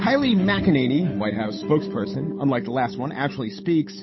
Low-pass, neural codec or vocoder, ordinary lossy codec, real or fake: 7.2 kHz; codec, 16 kHz, 8 kbps, FunCodec, trained on Chinese and English, 25 frames a second; MP3, 24 kbps; fake